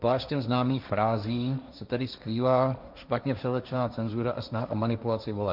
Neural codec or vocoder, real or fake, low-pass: codec, 16 kHz, 1.1 kbps, Voila-Tokenizer; fake; 5.4 kHz